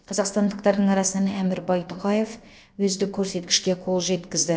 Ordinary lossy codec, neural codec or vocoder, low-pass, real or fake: none; codec, 16 kHz, about 1 kbps, DyCAST, with the encoder's durations; none; fake